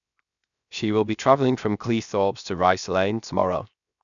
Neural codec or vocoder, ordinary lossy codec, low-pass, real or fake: codec, 16 kHz, 0.7 kbps, FocalCodec; none; 7.2 kHz; fake